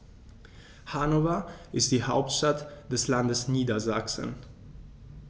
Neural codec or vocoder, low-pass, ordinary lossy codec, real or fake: none; none; none; real